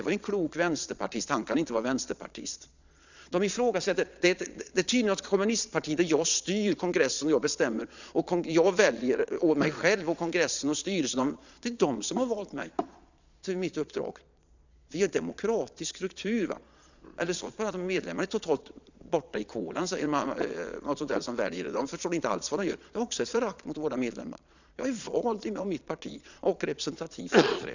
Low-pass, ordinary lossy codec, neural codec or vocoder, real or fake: 7.2 kHz; none; vocoder, 22.05 kHz, 80 mel bands, Vocos; fake